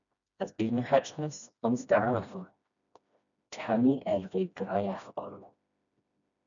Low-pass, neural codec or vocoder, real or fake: 7.2 kHz; codec, 16 kHz, 1 kbps, FreqCodec, smaller model; fake